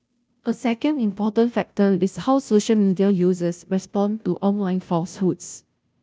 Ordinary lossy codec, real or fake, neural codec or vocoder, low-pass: none; fake; codec, 16 kHz, 0.5 kbps, FunCodec, trained on Chinese and English, 25 frames a second; none